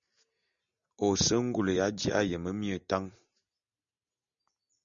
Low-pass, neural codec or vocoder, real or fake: 7.2 kHz; none; real